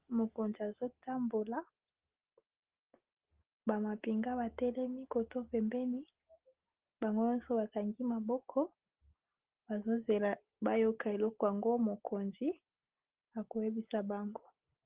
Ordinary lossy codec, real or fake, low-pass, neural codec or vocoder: Opus, 16 kbps; real; 3.6 kHz; none